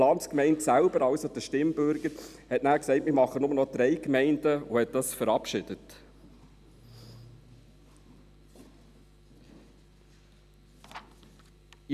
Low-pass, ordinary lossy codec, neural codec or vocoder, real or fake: 14.4 kHz; none; none; real